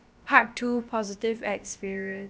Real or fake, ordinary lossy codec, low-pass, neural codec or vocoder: fake; none; none; codec, 16 kHz, about 1 kbps, DyCAST, with the encoder's durations